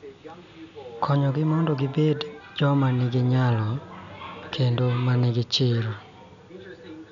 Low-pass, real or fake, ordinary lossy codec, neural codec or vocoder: 7.2 kHz; real; none; none